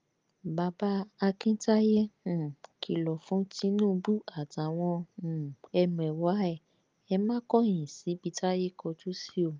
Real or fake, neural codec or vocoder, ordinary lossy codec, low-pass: real; none; Opus, 24 kbps; 7.2 kHz